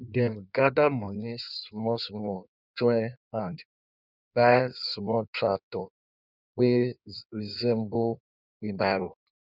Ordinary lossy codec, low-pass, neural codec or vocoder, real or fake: none; 5.4 kHz; codec, 16 kHz in and 24 kHz out, 1.1 kbps, FireRedTTS-2 codec; fake